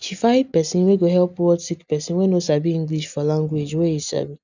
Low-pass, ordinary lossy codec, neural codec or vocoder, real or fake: 7.2 kHz; none; none; real